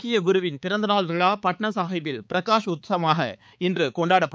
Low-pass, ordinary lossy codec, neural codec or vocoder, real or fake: none; none; codec, 16 kHz, 4 kbps, X-Codec, HuBERT features, trained on balanced general audio; fake